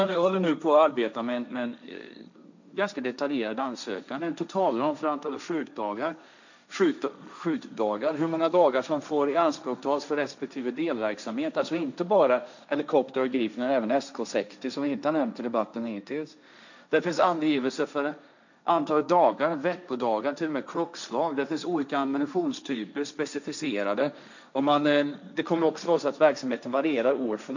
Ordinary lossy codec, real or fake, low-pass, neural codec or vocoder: none; fake; 7.2 kHz; codec, 16 kHz, 1.1 kbps, Voila-Tokenizer